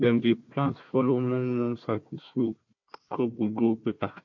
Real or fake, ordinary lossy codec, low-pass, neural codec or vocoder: fake; MP3, 48 kbps; 7.2 kHz; codec, 16 kHz, 1 kbps, FunCodec, trained on Chinese and English, 50 frames a second